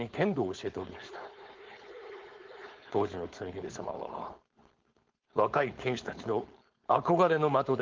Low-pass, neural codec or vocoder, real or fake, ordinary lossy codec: 7.2 kHz; codec, 16 kHz, 4.8 kbps, FACodec; fake; Opus, 16 kbps